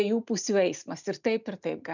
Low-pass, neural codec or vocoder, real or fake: 7.2 kHz; none; real